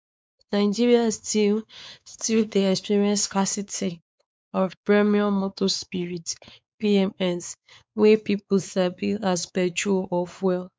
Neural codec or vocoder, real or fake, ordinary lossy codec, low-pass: codec, 16 kHz, 4 kbps, X-Codec, WavLM features, trained on Multilingual LibriSpeech; fake; none; none